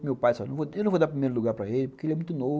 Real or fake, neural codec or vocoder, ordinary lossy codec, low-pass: real; none; none; none